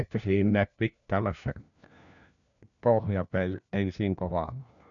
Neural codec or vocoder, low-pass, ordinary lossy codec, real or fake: codec, 16 kHz, 1 kbps, FunCodec, trained on LibriTTS, 50 frames a second; 7.2 kHz; none; fake